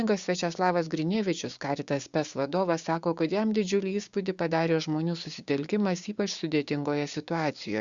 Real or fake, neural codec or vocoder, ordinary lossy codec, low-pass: fake; codec, 16 kHz, 6 kbps, DAC; Opus, 64 kbps; 7.2 kHz